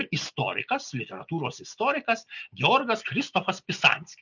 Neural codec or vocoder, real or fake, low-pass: none; real; 7.2 kHz